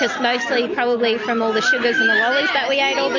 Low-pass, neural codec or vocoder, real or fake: 7.2 kHz; none; real